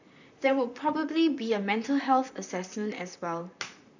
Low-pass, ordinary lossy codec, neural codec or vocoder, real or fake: 7.2 kHz; none; vocoder, 44.1 kHz, 128 mel bands, Pupu-Vocoder; fake